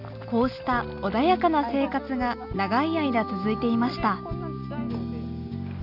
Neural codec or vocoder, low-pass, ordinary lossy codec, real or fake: none; 5.4 kHz; none; real